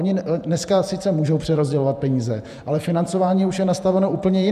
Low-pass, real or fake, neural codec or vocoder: 14.4 kHz; fake; vocoder, 48 kHz, 128 mel bands, Vocos